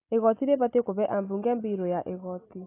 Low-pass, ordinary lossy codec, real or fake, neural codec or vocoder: 3.6 kHz; AAC, 16 kbps; real; none